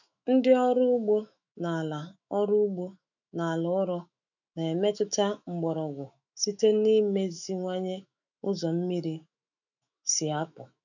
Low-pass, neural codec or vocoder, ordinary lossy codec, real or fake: 7.2 kHz; autoencoder, 48 kHz, 128 numbers a frame, DAC-VAE, trained on Japanese speech; none; fake